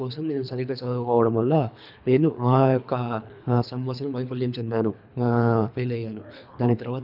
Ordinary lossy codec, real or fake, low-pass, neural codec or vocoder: none; fake; 5.4 kHz; codec, 24 kHz, 3 kbps, HILCodec